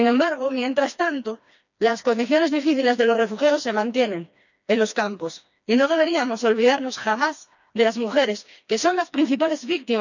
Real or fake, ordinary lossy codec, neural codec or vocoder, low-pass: fake; none; codec, 16 kHz, 2 kbps, FreqCodec, smaller model; 7.2 kHz